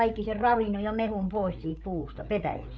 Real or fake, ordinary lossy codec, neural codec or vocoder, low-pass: fake; none; codec, 16 kHz, 8 kbps, FreqCodec, larger model; none